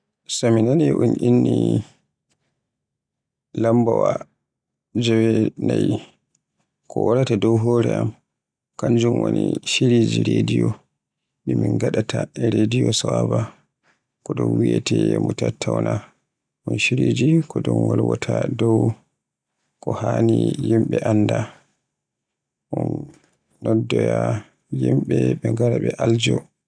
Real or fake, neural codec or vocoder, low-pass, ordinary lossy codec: real; none; 9.9 kHz; none